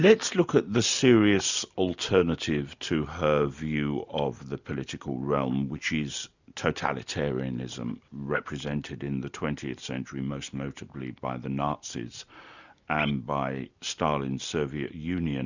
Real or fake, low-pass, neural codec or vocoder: real; 7.2 kHz; none